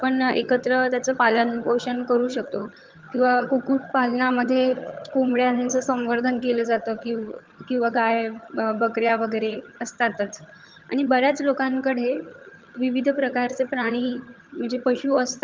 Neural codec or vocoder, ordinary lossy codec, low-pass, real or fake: vocoder, 22.05 kHz, 80 mel bands, HiFi-GAN; Opus, 24 kbps; 7.2 kHz; fake